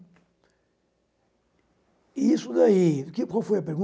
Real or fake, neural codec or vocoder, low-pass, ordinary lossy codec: real; none; none; none